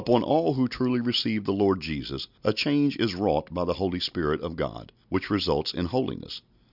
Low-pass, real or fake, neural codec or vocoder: 5.4 kHz; real; none